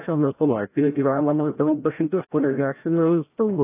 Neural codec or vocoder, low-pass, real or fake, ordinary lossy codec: codec, 16 kHz, 0.5 kbps, FreqCodec, larger model; 3.6 kHz; fake; AAC, 24 kbps